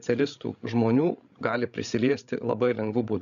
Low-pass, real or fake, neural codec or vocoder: 7.2 kHz; fake; codec, 16 kHz, 16 kbps, FreqCodec, larger model